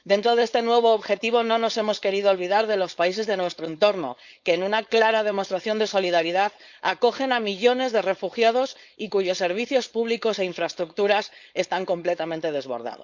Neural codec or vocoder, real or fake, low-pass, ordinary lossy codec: codec, 16 kHz, 4.8 kbps, FACodec; fake; 7.2 kHz; Opus, 64 kbps